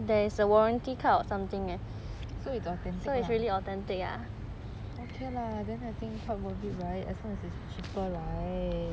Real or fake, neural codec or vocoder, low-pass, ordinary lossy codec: real; none; none; none